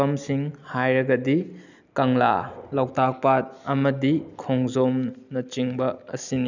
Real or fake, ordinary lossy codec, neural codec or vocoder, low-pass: real; none; none; 7.2 kHz